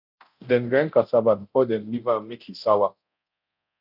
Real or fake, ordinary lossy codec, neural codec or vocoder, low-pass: fake; none; codec, 24 kHz, 0.9 kbps, DualCodec; 5.4 kHz